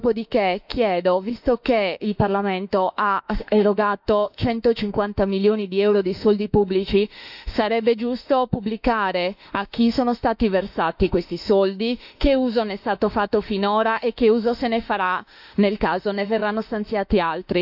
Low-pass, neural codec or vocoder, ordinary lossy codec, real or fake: 5.4 kHz; autoencoder, 48 kHz, 32 numbers a frame, DAC-VAE, trained on Japanese speech; none; fake